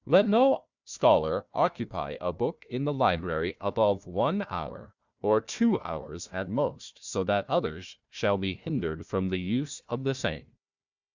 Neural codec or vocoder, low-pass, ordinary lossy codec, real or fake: codec, 16 kHz, 1 kbps, FunCodec, trained on Chinese and English, 50 frames a second; 7.2 kHz; Opus, 64 kbps; fake